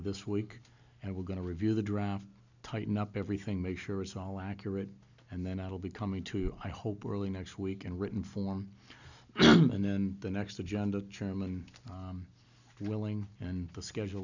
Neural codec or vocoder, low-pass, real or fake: none; 7.2 kHz; real